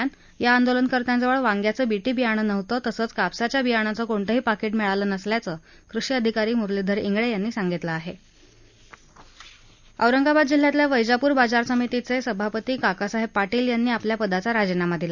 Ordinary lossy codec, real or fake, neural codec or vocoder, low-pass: none; real; none; 7.2 kHz